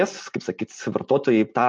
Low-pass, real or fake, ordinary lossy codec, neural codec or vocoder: 9.9 kHz; real; MP3, 48 kbps; none